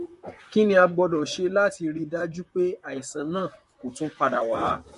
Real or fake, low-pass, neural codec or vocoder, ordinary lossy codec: fake; 14.4 kHz; vocoder, 44.1 kHz, 128 mel bands, Pupu-Vocoder; MP3, 48 kbps